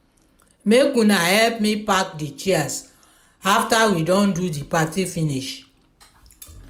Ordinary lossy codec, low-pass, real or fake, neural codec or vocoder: none; 19.8 kHz; real; none